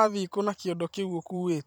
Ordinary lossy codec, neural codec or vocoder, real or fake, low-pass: none; vocoder, 44.1 kHz, 128 mel bands every 512 samples, BigVGAN v2; fake; none